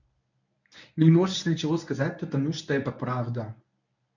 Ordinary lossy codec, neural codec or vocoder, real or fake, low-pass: none; codec, 24 kHz, 0.9 kbps, WavTokenizer, medium speech release version 1; fake; 7.2 kHz